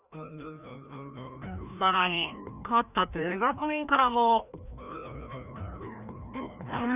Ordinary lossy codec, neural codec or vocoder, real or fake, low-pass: none; codec, 16 kHz, 1 kbps, FreqCodec, larger model; fake; 3.6 kHz